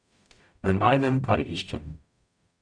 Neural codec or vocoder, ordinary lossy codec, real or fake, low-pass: codec, 44.1 kHz, 0.9 kbps, DAC; none; fake; 9.9 kHz